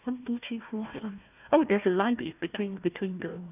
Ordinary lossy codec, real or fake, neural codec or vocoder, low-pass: none; fake; codec, 16 kHz, 1 kbps, FunCodec, trained on Chinese and English, 50 frames a second; 3.6 kHz